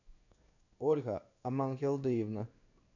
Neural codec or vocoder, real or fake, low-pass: codec, 16 kHz in and 24 kHz out, 1 kbps, XY-Tokenizer; fake; 7.2 kHz